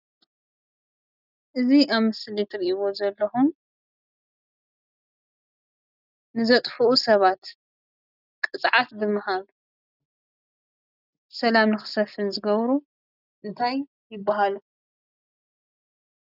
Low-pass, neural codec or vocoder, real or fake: 5.4 kHz; none; real